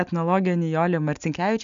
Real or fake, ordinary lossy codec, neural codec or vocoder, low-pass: real; MP3, 96 kbps; none; 7.2 kHz